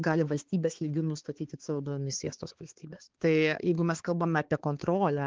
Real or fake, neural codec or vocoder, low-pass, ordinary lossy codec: fake; codec, 16 kHz, 4 kbps, X-Codec, HuBERT features, trained on balanced general audio; 7.2 kHz; Opus, 16 kbps